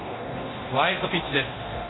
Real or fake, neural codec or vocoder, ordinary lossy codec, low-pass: fake; codec, 24 kHz, 0.9 kbps, DualCodec; AAC, 16 kbps; 7.2 kHz